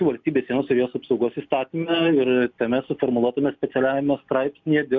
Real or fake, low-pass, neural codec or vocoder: real; 7.2 kHz; none